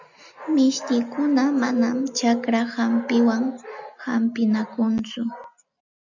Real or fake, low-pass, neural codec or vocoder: real; 7.2 kHz; none